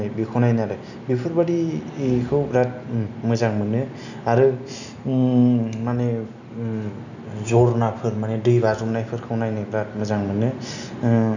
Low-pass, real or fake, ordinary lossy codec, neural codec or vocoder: 7.2 kHz; real; none; none